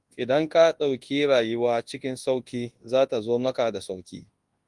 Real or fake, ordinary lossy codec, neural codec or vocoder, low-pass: fake; Opus, 24 kbps; codec, 24 kHz, 0.9 kbps, WavTokenizer, large speech release; 10.8 kHz